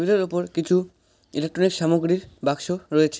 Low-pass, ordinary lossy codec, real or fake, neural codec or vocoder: none; none; real; none